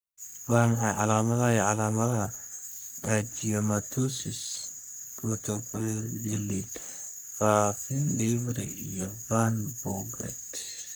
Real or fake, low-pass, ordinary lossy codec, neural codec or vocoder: fake; none; none; codec, 44.1 kHz, 3.4 kbps, Pupu-Codec